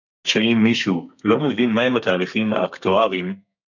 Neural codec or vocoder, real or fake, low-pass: codec, 32 kHz, 1.9 kbps, SNAC; fake; 7.2 kHz